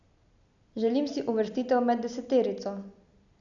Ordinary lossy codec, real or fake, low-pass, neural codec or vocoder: none; real; 7.2 kHz; none